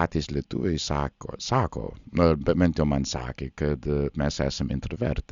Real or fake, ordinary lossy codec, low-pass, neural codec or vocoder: real; Opus, 64 kbps; 7.2 kHz; none